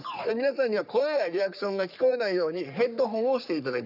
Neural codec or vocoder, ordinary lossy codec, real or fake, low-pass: codec, 44.1 kHz, 3.4 kbps, Pupu-Codec; none; fake; 5.4 kHz